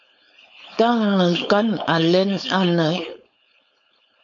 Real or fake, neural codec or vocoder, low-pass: fake; codec, 16 kHz, 4.8 kbps, FACodec; 7.2 kHz